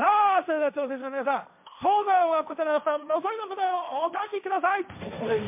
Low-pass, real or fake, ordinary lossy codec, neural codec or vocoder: 3.6 kHz; fake; MP3, 32 kbps; codec, 16 kHz, 1.1 kbps, Voila-Tokenizer